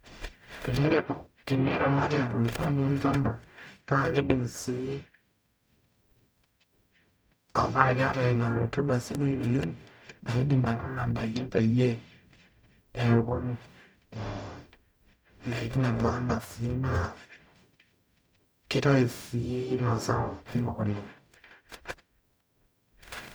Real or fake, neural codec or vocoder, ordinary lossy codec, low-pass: fake; codec, 44.1 kHz, 0.9 kbps, DAC; none; none